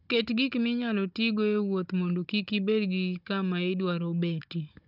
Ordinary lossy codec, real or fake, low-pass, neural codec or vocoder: none; fake; 5.4 kHz; codec, 16 kHz, 16 kbps, FunCodec, trained on Chinese and English, 50 frames a second